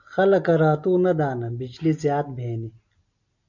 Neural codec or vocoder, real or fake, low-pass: none; real; 7.2 kHz